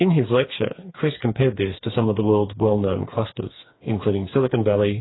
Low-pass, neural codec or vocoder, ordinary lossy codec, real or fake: 7.2 kHz; codec, 16 kHz, 4 kbps, FreqCodec, smaller model; AAC, 16 kbps; fake